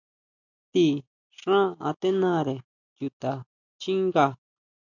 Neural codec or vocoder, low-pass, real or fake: none; 7.2 kHz; real